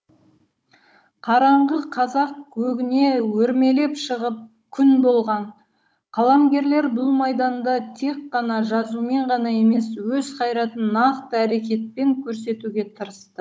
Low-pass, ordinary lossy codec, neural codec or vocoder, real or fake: none; none; codec, 16 kHz, 16 kbps, FunCodec, trained on Chinese and English, 50 frames a second; fake